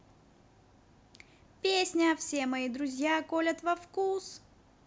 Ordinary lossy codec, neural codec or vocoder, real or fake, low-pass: none; none; real; none